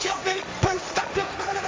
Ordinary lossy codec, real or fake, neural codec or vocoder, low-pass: none; fake; codec, 16 kHz, 1.1 kbps, Voila-Tokenizer; none